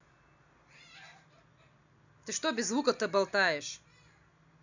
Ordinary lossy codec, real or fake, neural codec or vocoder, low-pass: none; real; none; 7.2 kHz